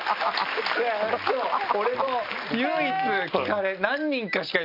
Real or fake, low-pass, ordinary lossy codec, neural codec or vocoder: real; 5.4 kHz; none; none